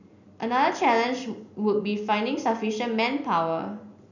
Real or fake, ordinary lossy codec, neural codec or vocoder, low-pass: real; none; none; 7.2 kHz